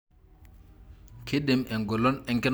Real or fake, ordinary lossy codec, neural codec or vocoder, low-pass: real; none; none; none